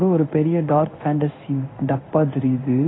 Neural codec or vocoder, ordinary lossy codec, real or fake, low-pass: codec, 16 kHz in and 24 kHz out, 1 kbps, XY-Tokenizer; AAC, 16 kbps; fake; 7.2 kHz